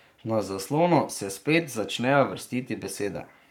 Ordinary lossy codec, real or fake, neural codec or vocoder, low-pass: none; fake; codec, 44.1 kHz, 7.8 kbps, Pupu-Codec; 19.8 kHz